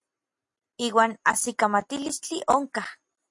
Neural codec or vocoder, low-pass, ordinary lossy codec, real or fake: none; 10.8 kHz; MP3, 48 kbps; real